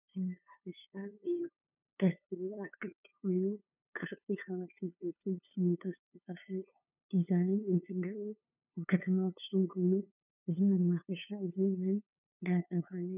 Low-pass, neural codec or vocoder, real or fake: 3.6 kHz; codec, 16 kHz, 2 kbps, FunCodec, trained on LibriTTS, 25 frames a second; fake